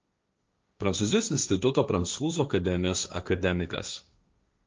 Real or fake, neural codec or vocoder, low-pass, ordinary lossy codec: fake; codec, 16 kHz, 1.1 kbps, Voila-Tokenizer; 7.2 kHz; Opus, 24 kbps